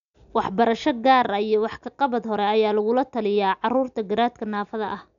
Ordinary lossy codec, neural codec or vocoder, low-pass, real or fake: none; none; 7.2 kHz; real